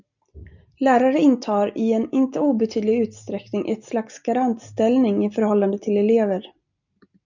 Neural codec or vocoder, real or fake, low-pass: none; real; 7.2 kHz